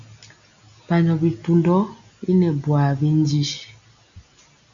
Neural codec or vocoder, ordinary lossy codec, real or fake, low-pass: none; AAC, 64 kbps; real; 7.2 kHz